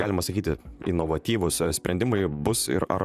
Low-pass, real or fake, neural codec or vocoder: 14.4 kHz; fake; vocoder, 44.1 kHz, 128 mel bands, Pupu-Vocoder